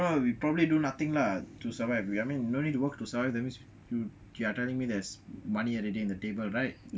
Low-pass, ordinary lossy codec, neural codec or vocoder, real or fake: none; none; none; real